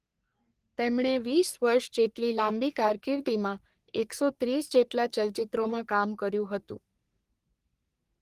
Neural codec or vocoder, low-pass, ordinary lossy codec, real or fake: codec, 44.1 kHz, 3.4 kbps, Pupu-Codec; 14.4 kHz; Opus, 24 kbps; fake